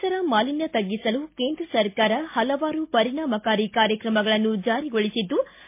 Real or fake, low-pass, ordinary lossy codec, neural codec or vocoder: real; 3.6 kHz; MP3, 24 kbps; none